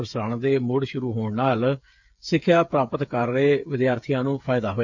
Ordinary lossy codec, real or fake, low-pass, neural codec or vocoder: none; fake; 7.2 kHz; codec, 16 kHz, 8 kbps, FreqCodec, smaller model